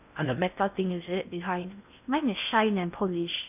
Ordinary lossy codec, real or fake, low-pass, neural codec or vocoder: none; fake; 3.6 kHz; codec, 16 kHz in and 24 kHz out, 0.6 kbps, FocalCodec, streaming, 4096 codes